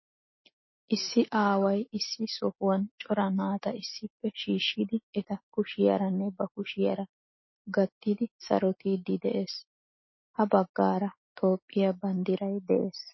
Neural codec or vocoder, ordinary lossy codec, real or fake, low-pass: none; MP3, 24 kbps; real; 7.2 kHz